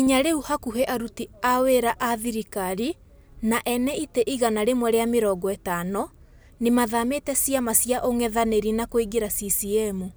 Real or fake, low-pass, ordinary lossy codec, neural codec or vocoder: real; none; none; none